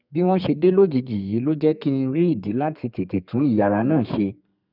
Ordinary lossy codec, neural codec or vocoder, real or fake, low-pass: none; codec, 32 kHz, 1.9 kbps, SNAC; fake; 5.4 kHz